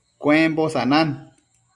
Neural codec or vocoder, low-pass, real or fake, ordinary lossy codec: none; 10.8 kHz; real; Opus, 64 kbps